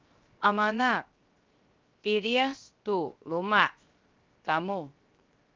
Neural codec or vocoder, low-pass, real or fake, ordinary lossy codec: codec, 16 kHz, 0.7 kbps, FocalCodec; 7.2 kHz; fake; Opus, 24 kbps